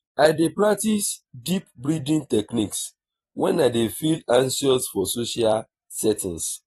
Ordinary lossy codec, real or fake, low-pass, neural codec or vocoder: AAC, 32 kbps; fake; 19.8 kHz; vocoder, 44.1 kHz, 128 mel bands every 256 samples, BigVGAN v2